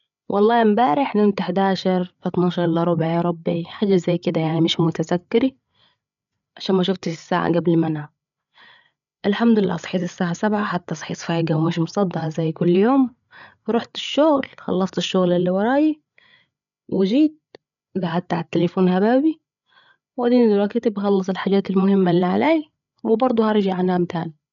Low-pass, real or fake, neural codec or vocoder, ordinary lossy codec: 7.2 kHz; fake; codec, 16 kHz, 8 kbps, FreqCodec, larger model; none